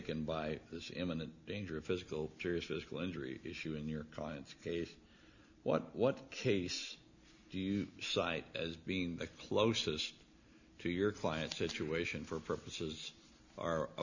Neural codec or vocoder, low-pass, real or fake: none; 7.2 kHz; real